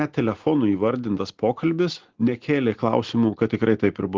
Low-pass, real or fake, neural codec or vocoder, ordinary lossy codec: 7.2 kHz; real; none; Opus, 16 kbps